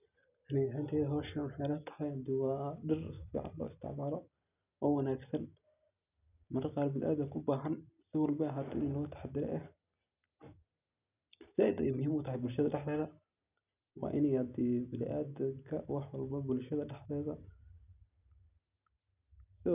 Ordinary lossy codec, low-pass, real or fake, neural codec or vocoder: none; 3.6 kHz; real; none